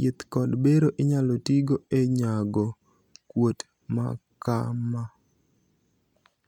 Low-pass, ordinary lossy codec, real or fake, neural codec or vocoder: 19.8 kHz; none; real; none